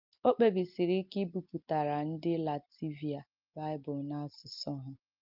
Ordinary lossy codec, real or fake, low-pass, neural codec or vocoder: Opus, 32 kbps; real; 5.4 kHz; none